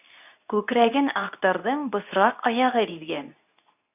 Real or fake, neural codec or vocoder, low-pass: fake; codec, 24 kHz, 0.9 kbps, WavTokenizer, medium speech release version 2; 3.6 kHz